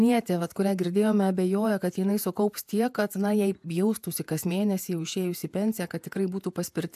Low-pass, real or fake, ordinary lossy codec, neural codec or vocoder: 14.4 kHz; fake; AAC, 96 kbps; vocoder, 48 kHz, 128 mel bands, Vocos